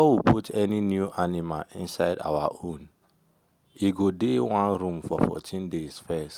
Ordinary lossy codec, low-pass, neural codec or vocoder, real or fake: Opus, 32 kbps; 19.8 kHz; none; real